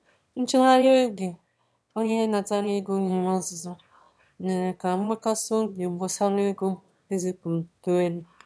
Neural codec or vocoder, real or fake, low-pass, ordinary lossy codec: autoencoder, 22.05 kHz, a latent of 192 numbers a frame, VITS, trained on one speaker; fake; none; none